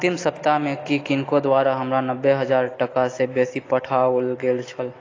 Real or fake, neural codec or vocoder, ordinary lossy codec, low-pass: real; none; AAC, 32 kbps; 7.2 kHz